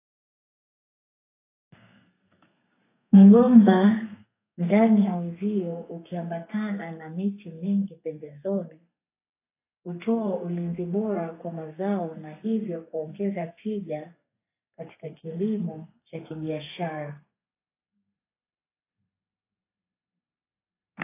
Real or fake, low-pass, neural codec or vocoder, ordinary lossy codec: fake; 3.6 kHz; codec, 32 kHz, 1.9 kbps, SNAC; AAC, 24 kbps